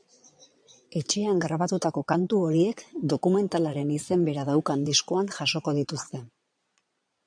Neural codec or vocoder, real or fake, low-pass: vocoder, 22.05 kHz, 80 mel bands, Vocos; fake; 9.9 kHz